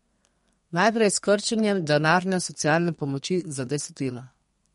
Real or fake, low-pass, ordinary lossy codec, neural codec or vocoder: fake; 14.4 kHz; MP3, 48 kbps; codec, 32 kHz, 1.9 kbps, SNAC